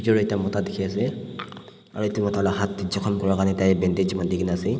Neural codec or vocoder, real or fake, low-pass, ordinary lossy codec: none; real; none; none